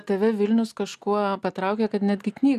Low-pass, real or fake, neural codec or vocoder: 14.4 kHz; real; none